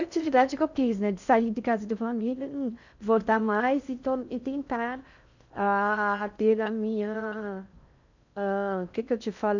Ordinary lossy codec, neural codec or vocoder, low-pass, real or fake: none; codec, 16 kHz in and 24 kHz out, 0.6 kbps, FocalCodec, streaming, 2048 codes; 7.2 kHz; fake